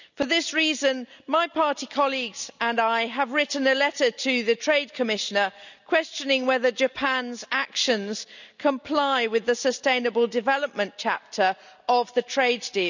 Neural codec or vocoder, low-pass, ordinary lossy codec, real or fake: none; 7.2 kHz; none; real